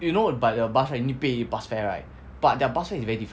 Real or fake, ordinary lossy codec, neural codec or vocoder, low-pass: real; none; none; none